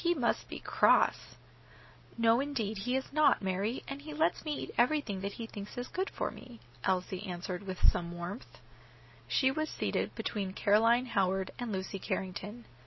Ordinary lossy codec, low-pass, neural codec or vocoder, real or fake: MP3, 24 kbps; 7.2 kHz; none; real